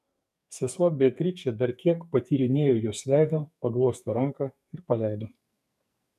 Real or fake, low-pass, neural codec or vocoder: fake; 14.4 kHz; codec, 44.1 kHz, 2.6 kbps, SNAC